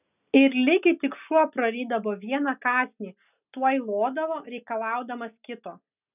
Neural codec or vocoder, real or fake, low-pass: none; real; 3.6 kHz